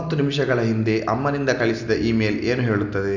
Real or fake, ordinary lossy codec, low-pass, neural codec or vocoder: real; none; 7.2 kHz; none